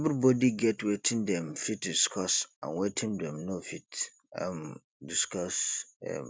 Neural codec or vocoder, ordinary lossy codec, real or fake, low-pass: none; none; real; none